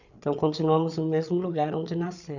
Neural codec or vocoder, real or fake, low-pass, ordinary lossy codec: codec, 16 kHz, 8 kbps, FreqCodec, larger model; fake; 7.2 kHz; none